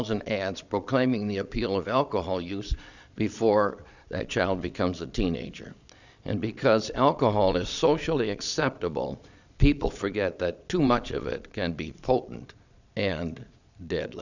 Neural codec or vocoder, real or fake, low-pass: vocoder, 22.05 kHz, 80 mel bands, Vocos; fake; 7.2 kHz